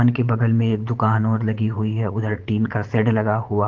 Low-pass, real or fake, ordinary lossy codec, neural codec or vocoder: 7.2 kHz; fake; Opus, 16 kbps; vocoder, 44.1 kHz, 80 mel bands, Vocos